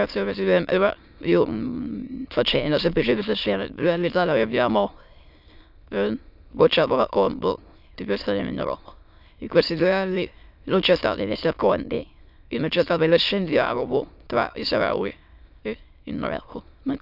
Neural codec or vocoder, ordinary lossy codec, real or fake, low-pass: autoencoder, 22.05 kHz, a latent of 192 numbers a frame, VITS, trained on many speakers; none; fake; 5.4 kHz